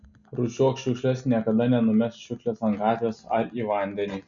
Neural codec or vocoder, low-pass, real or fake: none; 7.2 kHz; real